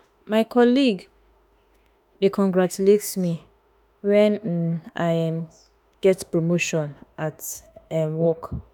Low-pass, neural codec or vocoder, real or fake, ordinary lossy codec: 19.8 kHz; autoencoder, 48 kHz, 32 numbers a frame, DAC-VAE, trained on Japanese speech; fake; none